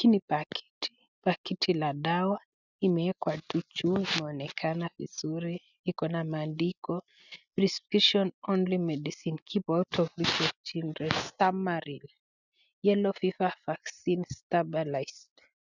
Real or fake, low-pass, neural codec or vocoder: real; 7.2 kHz; none